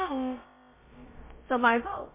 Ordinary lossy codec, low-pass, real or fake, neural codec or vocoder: MP3, 24 kbps; 3.6 kHz; fake; codec, 16 kHz, about 1 kbps, DyCAST, with the encoder's durations